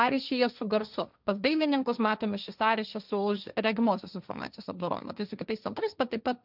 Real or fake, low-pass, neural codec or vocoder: fake; 5.4 kHz; codec, 16 kHz, 1.1 kbps, Voila-Tokenizer